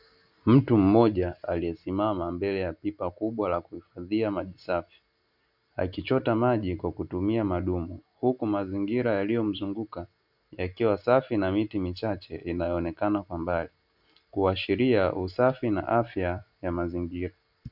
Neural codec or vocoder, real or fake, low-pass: none; real; 5.4 kHz